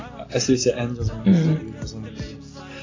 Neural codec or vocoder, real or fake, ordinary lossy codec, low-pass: none; real; AAC, 32 kbps; 7.2 kHz